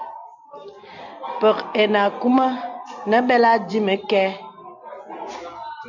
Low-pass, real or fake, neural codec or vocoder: 7.2 kHz; real; none